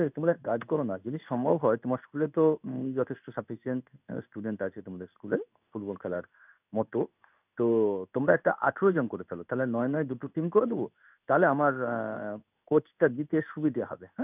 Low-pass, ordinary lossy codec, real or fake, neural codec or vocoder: 3.6 kHz; none; fake; codec, 16 kHz in and 24 kHz out, 1 kbps, XY-Tokenizer